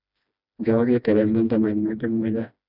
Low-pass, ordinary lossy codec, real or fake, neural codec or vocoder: 5.4 kHz; none; fake; codec, 16 kHz, 1 kbps, FreqCodec, smaller model